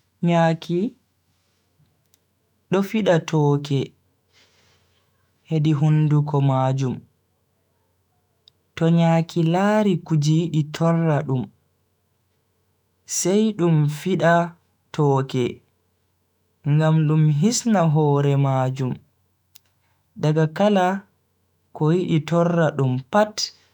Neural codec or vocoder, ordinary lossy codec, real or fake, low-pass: autoencoder, 48 kHz, 128 numbers a frame, DAC-VAE, trained on Japanese speech; none; fake; 19.8 kHz